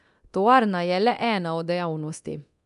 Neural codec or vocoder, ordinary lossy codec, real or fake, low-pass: codec, 24 kHz, 0.9 kbps, DualCodec; none; fake; 10.8 kHz